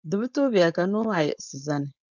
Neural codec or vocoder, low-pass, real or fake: codec, 16 kHz, 4.8 kbps, FACodec; 7.2 kHz; fake